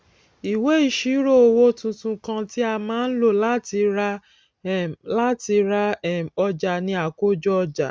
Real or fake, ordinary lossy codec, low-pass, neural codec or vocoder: real; none; none; none